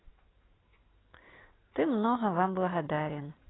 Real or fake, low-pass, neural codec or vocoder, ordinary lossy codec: real; 7.2 kHz; none; AAC, 16 kbps